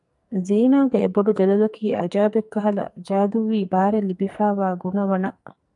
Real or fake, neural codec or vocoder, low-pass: fake; codec, 44.1 kHz, 2.6 kbps, SNAC; 10.8 kHz